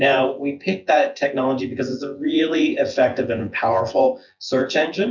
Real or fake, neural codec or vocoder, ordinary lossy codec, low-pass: fake; vocoder, 24 kHz, 100 mel bands, Vocos; MP3, 64 kbps; 7.2 kHz